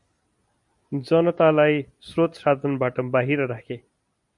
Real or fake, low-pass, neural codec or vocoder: real; 10.8 kHz; none